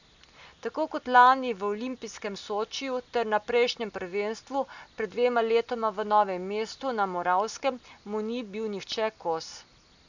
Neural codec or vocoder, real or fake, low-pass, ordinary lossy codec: none; real; 7.2 kHz; none